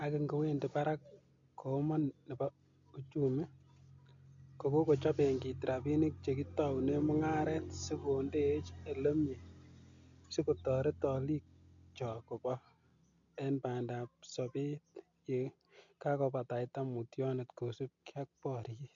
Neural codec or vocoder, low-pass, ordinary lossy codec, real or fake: none; 7.2 kHz; MP3, 64 kbps; real